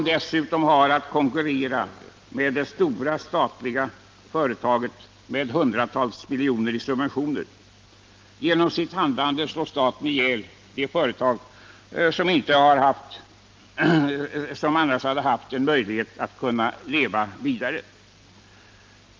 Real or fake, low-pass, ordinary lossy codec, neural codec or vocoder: real; 7.2 kHz; Opus, 24 kbps; none